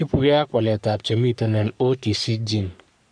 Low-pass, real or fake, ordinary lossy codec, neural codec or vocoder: 9.9 kHz; fake; none; codec, 44.1 kHz, 3.4 kbps, Pupu-Codec